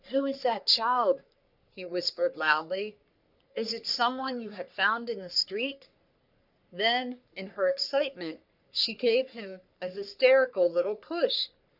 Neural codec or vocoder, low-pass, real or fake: codec, 44.1 kHz, 3.4 kbps, Pupu-Codec; 5.4 kHz; fake